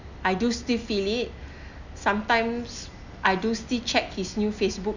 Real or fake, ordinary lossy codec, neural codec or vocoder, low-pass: real; none; none; 7.2 kHz